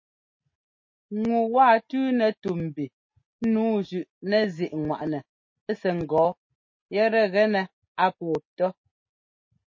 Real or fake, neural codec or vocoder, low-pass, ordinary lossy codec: real; none; 7.2 kHz; MP3, 32 kbps